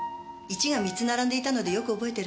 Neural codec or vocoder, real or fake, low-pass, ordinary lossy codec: none; real; none; none